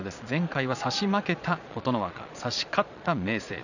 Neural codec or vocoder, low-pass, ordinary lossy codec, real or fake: vocoder, 22.05 kHz, 80 mel bands, Vocos; 7.2 kHz; none; fake